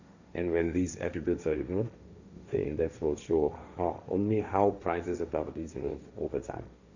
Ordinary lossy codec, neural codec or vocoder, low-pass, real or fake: none; codec, 16 kHz, 1.1 kbps, Voila-Tokenizer; 7.2 kHz; fake